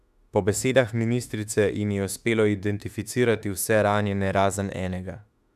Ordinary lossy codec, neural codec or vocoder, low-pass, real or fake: none; autoencoder, 48 kHz, 32 numbers a frame, DAC-VAE, trained on Japanese speech; 14.4 kHz; fake